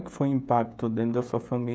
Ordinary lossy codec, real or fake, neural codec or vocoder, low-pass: none; fake; codec, 16 kHz, 16 kbps, FreqCodec, smaller model; none